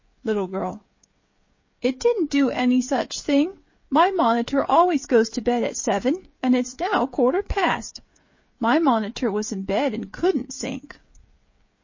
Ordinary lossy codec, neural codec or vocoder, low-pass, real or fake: MP3, 32 kbps; codec, 16 kHz, 16 kbps, FreqCodec, smaller model; 7.2 kHz; fake